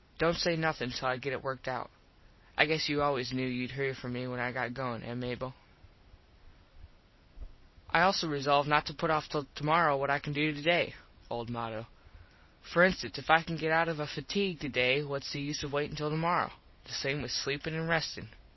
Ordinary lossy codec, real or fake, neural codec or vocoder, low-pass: MP3, 24 kbps; real; none; 7.2 kHz